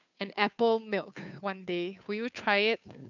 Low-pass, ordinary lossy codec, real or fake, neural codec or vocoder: 7.2 kHz; none; fake; codec, 16 kHz, 2 kbps, FunCodec, trained on Chinese and English, 25 frames a second